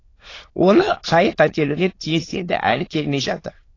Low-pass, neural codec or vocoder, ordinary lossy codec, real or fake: 7.2 kHz; autoencoder, 22.05 kHz, a latent of 192 numbers a frame, VITS, trained on many speakers; AAC, 32 kbps; fake